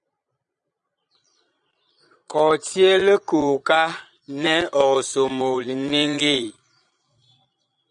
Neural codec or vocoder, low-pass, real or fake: vocoder, 22.05 kHz, 80 mel bands, Vocos; 9.9 kHz; fake